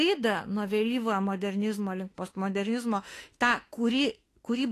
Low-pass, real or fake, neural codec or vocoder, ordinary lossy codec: 14.4 kHz; fake; autoencoder, 48 kHz, 32 numbers a frame, DAC-VAE, trained on Japanese speech; AAC, 48 kbps